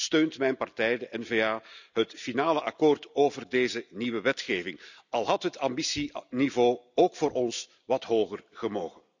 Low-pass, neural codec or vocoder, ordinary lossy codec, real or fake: 7.2 kHz; none; none; real